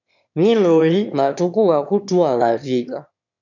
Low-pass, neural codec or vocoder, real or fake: 7.2 kHz; autoencoder, 22.05 kHz, a latent of 192 numbers a frame, VITS, trained on one speaker; fake